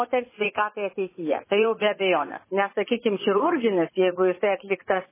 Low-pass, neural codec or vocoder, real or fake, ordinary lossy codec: 3.6 kHz; vocoder, 44.1 kHz, 80 mel bands, Vocos; fake; MP3, 16 kbps